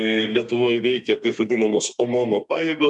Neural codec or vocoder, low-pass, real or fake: codec, 32 kHz, 1.9 kbps, SNAC; 10.8 kHz; fake